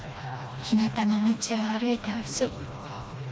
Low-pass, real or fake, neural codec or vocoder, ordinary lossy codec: none; fake; codec, 16 kHz, 1 kbps, FreqCodec, smaller model; none